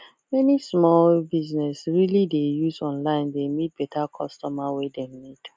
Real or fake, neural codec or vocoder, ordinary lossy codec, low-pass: real; none; none; none